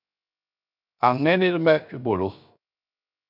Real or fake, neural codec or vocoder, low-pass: fake; codec, 16 kHz, 0.7 kbps, FocalCodec; 5.4 kHz